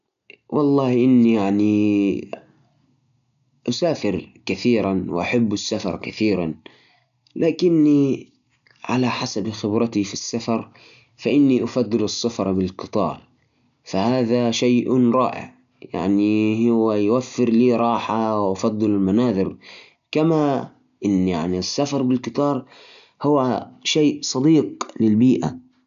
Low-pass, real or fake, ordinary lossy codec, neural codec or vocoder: 7.2 kHz; real; none; none